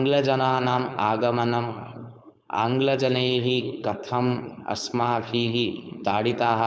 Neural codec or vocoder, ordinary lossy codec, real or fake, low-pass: codec, 16 kHz, 4.8 kbps, FACodec; none; fake; none